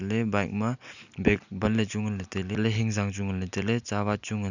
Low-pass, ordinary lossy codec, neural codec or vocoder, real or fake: 7.2 kHz; none; none; real